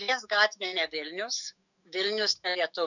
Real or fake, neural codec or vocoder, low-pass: fake; autoencoder, 48 kHz, 128 numbers a frame, DAC-VAE, trained on Japanese speech; 7.2 kHz